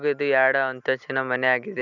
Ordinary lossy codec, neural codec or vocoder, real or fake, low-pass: none; none; real; 7.2 kHz